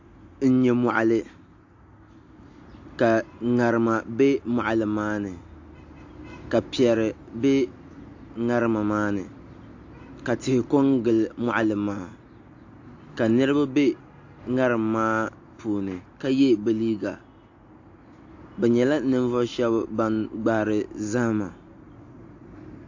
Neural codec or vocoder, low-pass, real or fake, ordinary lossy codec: none; 7.2 kHz; real; AAC, 48 kbps